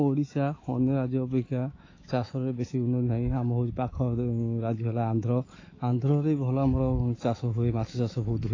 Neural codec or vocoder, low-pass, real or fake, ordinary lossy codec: autoencoder, 48 kHz, 128 numbers a frame, DAC-VAE, trained on Japanese speech; 7.2 kHz; fake; AAC, 32 kbps